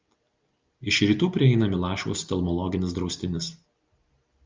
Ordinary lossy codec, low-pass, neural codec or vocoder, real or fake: Opus, 24 kbps; 7.2 kHz; none; real